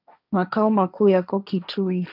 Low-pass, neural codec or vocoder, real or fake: 5.4 kHz; codec, 16 kHz, 1.1 kbps, Voila-Tokenizer; fake